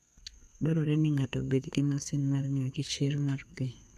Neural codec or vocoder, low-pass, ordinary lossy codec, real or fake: codec, 32 kHz, 1.9 kbps, SNAC; 14.4 kHz; none; fake